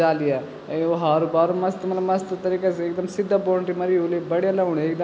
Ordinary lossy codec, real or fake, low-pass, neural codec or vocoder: none; real; none; none